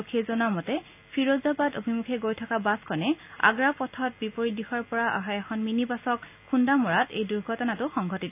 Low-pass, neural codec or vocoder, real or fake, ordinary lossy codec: 3.6 kHz; none; real; AAC, 32 kbps